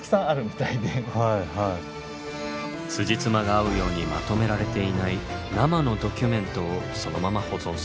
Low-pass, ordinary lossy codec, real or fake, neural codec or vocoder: none; none; real; none